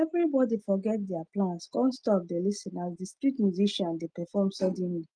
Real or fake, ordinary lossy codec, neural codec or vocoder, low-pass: real; Opus, 24 kbps; none; 9.9 kHz